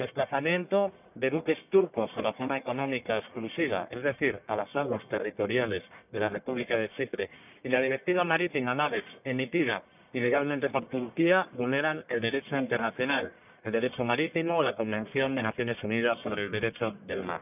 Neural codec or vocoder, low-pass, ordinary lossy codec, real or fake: codec, 44.1 kHz, 1.7 kbps, Pupu-Codec; 3.6 kHz; none; fake